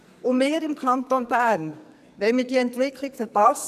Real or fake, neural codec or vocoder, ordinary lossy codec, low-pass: fake; codec, 44.1 kHz, 2.6 kbps, SNAC; none; 14.4 kHz